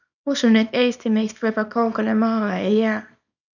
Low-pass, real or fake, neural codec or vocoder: 7.2 kHz; fake; codec, 24 kHz, 0.9 kbps, WavTokenizer, small release